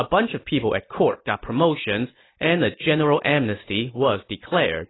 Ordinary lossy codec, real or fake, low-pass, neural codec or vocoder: AAC, 16 kbps; real; 7.2 kHz; none